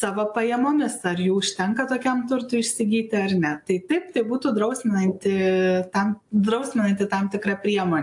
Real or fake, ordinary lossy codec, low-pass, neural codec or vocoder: real; AAC, 64 kbps; 10.8 kHz; none